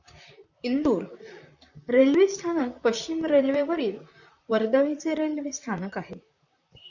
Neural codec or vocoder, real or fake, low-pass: vocoder, 44.1 kHz, 128 mel bands, Pupu-Vocoder; fake; 7.2 kHz